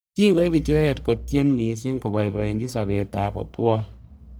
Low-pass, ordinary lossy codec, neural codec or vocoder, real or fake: none; none; codec, 44.1 kHz, 1.7 kbps, Pupu-Codec; fake